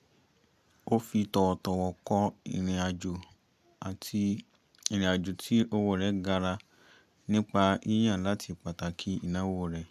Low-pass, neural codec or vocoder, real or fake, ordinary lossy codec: 14.4 kHz; none; real; none